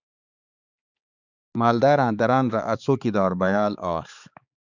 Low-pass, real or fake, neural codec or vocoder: 7.2 kHz; fake; codec, 16 kHz, 4 kbps, X-Codec, HuBERT features, trained on balanced general audio